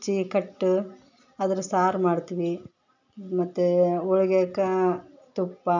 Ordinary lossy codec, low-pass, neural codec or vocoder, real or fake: none; 7.2 kHz; none; real